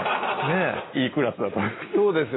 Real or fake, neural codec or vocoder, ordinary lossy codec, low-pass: real; none; AAC, 16 kbps; 7.2 kHz